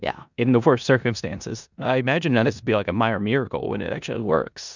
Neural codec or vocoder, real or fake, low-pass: codec, 16 kHz in and 24 kHz out, 0.9 kbps, LongCat-Audio-Codec, four codebook decoder; fake; 7.2 kHz